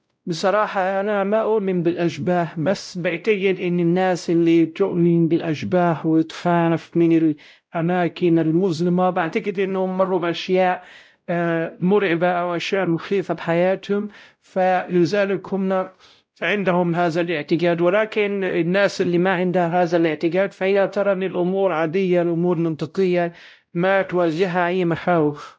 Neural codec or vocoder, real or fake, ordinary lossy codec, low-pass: codec, 16 kHz, 0.5 kbps, X-Codec, WavLM features, trained on Multilingual LibriSpeech; fake; none; none